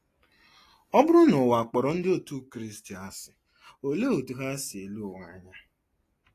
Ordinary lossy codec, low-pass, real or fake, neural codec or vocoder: AAC, 48 kbps; 14.4 kHz; real; none